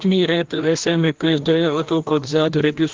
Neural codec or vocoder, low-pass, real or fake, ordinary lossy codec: codec, 16 kHz, 1 kbps, FreqCodec, larger model; 7.2 kHz; fake; Opus, 16 kbps